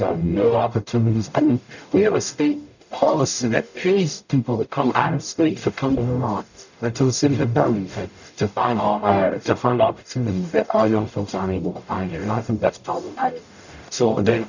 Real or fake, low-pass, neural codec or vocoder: fake; 7.2 kHz; codec, 44.1 kHz, 0.9 kbps, DAC